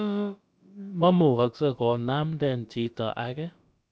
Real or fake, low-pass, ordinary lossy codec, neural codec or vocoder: fake; none; none; codec, 16 kHz, about 1 kbps, DyCAST, with the encoder's durations